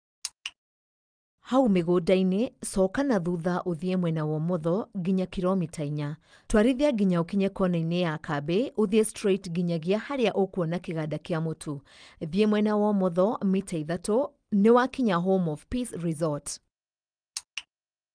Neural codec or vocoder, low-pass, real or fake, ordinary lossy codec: none; 9.9 kHz; real; Opus, 32 kbps